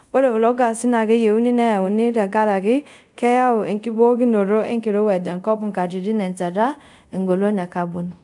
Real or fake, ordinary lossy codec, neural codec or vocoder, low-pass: fake; none; codec, 24 kHz, 0.5 kbps, DualCodec; 10.8 kHz